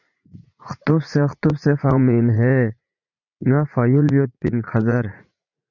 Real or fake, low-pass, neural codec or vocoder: fake; 7.2 kHz; vocoder, 44.1 kHz, 80 mel bands, Vocos